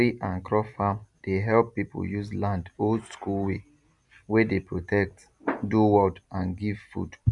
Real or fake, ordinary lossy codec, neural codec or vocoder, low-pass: real; none; none; 10.8 kHz